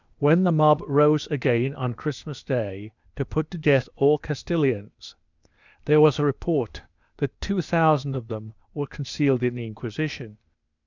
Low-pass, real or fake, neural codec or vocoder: 7.2 kHz; fake; codec, 16 kHz, 0.8 kbps, ZipCodec